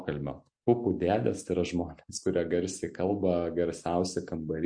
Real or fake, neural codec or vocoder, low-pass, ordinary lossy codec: real; none; 9.9 kHz; MP3, 48 kbps